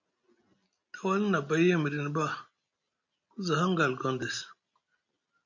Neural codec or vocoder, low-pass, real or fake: none; 7.2 kHz; real